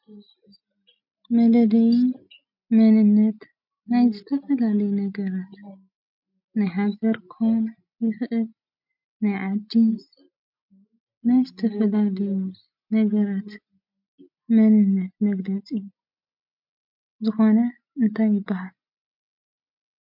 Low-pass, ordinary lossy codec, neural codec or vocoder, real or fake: 5.4 kHz; MP3, 48 kbps; none; real